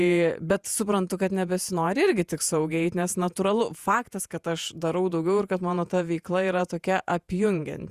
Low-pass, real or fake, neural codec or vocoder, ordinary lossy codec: 14.4 kHz; fake; vocoder, 48 kHz, 128 mel bands, Vocos; Opus, 64 kbps